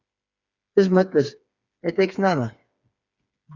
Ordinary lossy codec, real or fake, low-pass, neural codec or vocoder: Opus, 64 kbps; fake; 7.2 kHz; codec, 16 kHz, 4 kbps, FreqCodec, smaller model